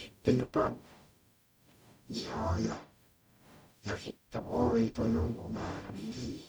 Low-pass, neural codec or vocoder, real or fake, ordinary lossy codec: none; codec, 44.1 kHz, 0.9 kbps, DAC; fake; none